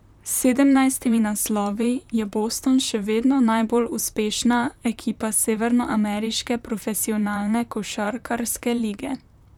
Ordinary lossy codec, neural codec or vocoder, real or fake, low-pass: none; vocoder, 44.1 kHz, 128 mel bands, Pupu-Vocoder; fake; 19.8 kHz